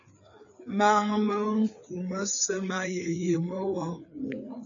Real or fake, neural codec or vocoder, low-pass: fake; codec, 16 kHz, 4 kbps, FreqCodec, larger model; 7.2 kHz